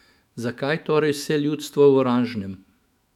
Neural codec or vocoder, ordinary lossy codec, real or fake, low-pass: autoencoder, 48 kHz, 128 numbers a frame, DAC-VAE, trained on Japanese speech; none; fake; 19.8 kHz